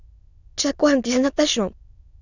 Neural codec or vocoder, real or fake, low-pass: autoencoder, 22.05 kHz, a latent of 192 numbers a frame, VITS, trained on many speakers; fake; 7.2 kHz